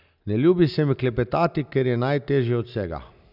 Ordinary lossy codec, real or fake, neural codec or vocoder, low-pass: none; real; none; 5.4 kHz